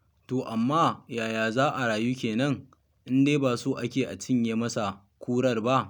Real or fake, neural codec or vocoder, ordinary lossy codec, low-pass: real; none; none; 19.8 kHz